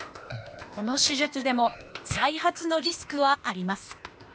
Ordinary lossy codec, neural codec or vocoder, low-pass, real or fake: none; codec, 16 kHz, 0.8 kbps, ZipCodec; none; fake